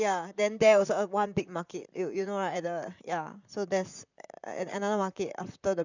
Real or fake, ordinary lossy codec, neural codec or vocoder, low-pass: fake; none; vocoder, 44.1 kHz, 128 mel bands, Pupu-Vocoder; 7.2 kHz